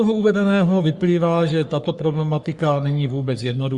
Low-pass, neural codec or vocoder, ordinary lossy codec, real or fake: 10.8 kHz; codec, 44.1 kHz, 3.4 kbps, Pupu-Codec; Opus, 64 kbps; fake